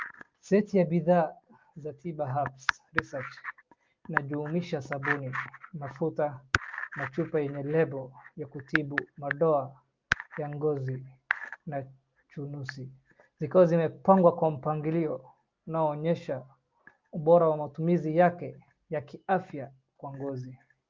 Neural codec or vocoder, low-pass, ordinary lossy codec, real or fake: none; 7.2 kHz; Opus, 24 kbps; real